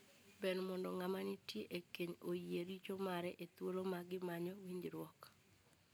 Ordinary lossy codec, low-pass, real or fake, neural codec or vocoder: none; none; real; none